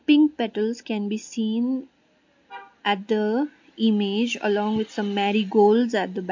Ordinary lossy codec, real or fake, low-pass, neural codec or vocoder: MP3, 48 kbps; real; 7.2 kHz; none